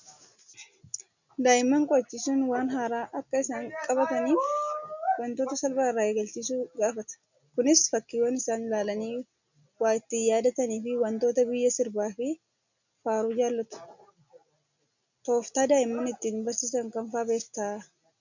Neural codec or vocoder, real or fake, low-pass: none; real; 7.2 kHz